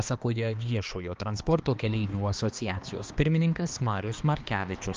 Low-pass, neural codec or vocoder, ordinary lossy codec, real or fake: 7.2 kHz; codec, 16 kHz, 2 kbps, X-Codec, HuBERT features, trained on balanced general audio; Opus, 32 kbps; fake